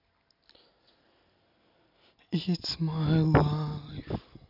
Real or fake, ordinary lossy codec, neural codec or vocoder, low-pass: real; none; none; 5.4 kHz